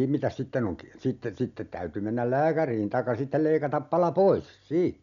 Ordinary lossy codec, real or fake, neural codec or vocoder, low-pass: none; real; none; 7.2 kHz